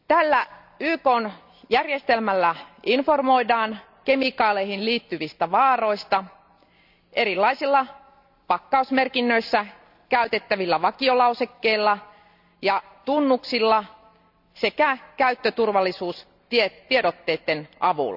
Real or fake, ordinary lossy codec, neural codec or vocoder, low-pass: real; none; none; 5.4 kHz